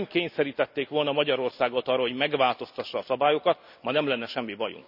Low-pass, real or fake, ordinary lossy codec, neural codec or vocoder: 5.4 kHz; real; none; none